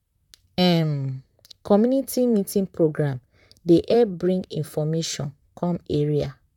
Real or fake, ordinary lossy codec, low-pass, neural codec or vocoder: fake; none; 19.8 kHz; vocoder, 44.1 kHz, 128 mel bands, Pupu-Vocoder